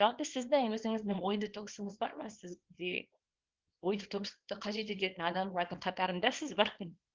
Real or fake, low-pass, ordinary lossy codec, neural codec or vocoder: fake; 7.2 kHz; Opus, 16 kbps; codec, 24 kHz, 0.9 kbps, WavTokenizer, small release